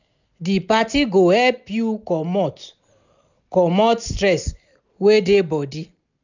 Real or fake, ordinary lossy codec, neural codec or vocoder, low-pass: real; none; none; 7.2 kHz